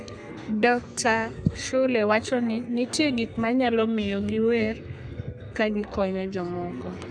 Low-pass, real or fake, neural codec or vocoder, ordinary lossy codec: 9.9 kHz; fake; codec, 32 kHz, 1.9 kbps, SNAC; none